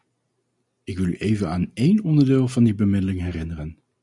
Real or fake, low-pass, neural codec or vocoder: real; 10.8 kHz; none